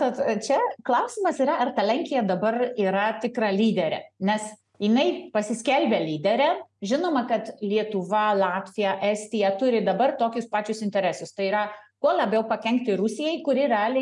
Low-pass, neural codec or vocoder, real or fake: 10.8 kHz; none; real